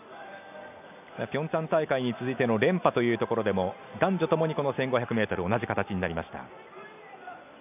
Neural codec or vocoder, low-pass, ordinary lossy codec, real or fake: none; 3.6 kHz; none; real